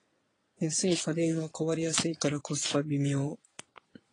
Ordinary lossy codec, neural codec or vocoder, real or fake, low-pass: AAC, 32 kbps; vocoder, 22.05 kHz, 80 mel bands, Vocos; fake; 9.9 kHz